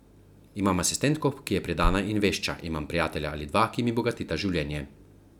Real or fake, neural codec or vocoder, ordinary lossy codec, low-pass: real; none; none; 19.8 kHz